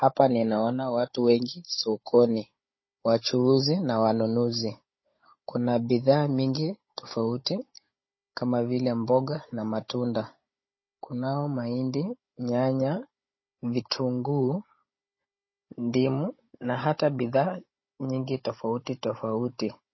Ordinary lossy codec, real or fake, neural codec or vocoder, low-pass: MP3, 24 kbps; fake; codec, 16 kHz, 16 kbps, FunCodec, trained on Chinese and English, 50 frames a second; 7.2 kHz